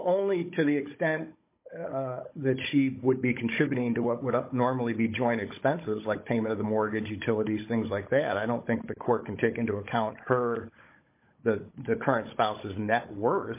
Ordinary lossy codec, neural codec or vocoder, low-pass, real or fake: MP3, 24 kbps; codec, 16 kHz, 16 kbps, FunCodec, trained on Chinese and English, 50 frames a second; 3.6 kHz; fake